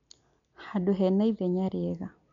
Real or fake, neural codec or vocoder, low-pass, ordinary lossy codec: real; none; 7.2 kHz; none